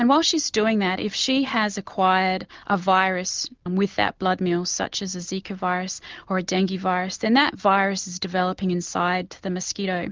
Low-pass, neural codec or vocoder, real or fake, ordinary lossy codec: 7.2 kHz; none; real; Opus, 32 kbps